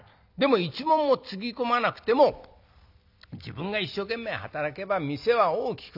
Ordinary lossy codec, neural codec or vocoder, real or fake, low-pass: none; none; real; 5.4 kHz